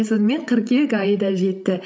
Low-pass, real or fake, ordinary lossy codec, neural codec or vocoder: none; fake; none; codec, 16 kHz, 8 kbps, FreqCodec, larger model